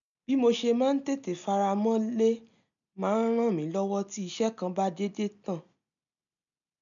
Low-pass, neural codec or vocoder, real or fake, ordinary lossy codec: 7.2 kHz; none; real; none